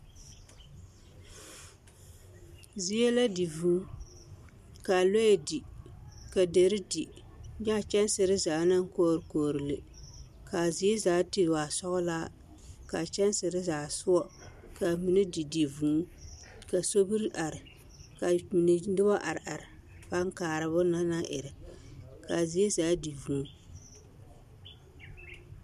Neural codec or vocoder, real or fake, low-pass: none; real; 14.4 kHz